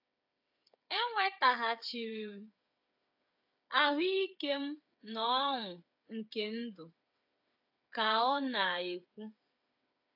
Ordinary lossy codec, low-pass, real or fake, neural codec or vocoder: AAC, 48 kbps; 5.4 kHz; fake; codec, 16 kHz, 8 kbps, FreqCodec, smaller model